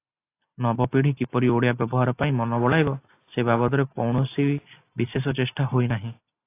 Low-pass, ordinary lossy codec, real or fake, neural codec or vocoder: 3.6 kHz; AAC, 24 kbps; real; none